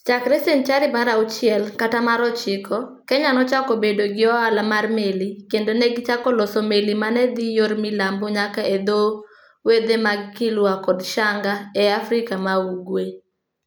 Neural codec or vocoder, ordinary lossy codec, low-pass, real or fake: none; none; none; real